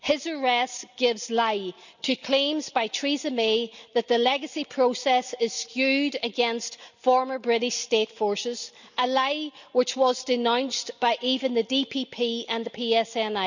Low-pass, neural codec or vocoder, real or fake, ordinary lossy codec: 7.2 kHz; none; real; none